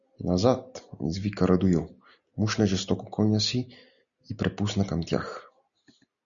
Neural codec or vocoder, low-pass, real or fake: none; 7.2 kHz; real